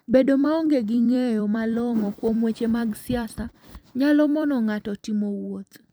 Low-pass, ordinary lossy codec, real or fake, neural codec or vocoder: none; none; fake; vocoder, 44.1 kHz, 128 mel bands every 256 samples, BigVGAN v2